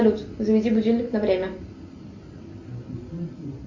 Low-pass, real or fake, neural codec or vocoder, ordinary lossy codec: 7.2 kHz; real; none; MP3, 64 kbps